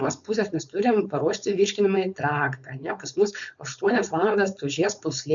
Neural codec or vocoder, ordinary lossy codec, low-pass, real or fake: codec, 16 kHz, 4.8 kbps, FACodec; MP3, 96 kbps; 7.2 kHz; fake